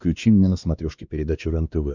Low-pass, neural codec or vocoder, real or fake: 7.2 kHz; codec, 16 kHz, 2 kbps, X-Codec, WavLM features, trained on Multilingual LibriSpeech; fake